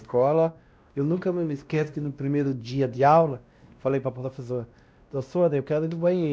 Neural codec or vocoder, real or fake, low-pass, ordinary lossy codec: codec, 16 kHz, 1 kbps, X-Codec, WavLM features, trained on Multilingual LibriSpeech; fake; none; none